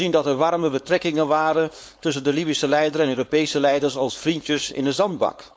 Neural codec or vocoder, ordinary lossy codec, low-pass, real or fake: codec, 16 kHz, 4.8 kbps, FACodec; none; none; fake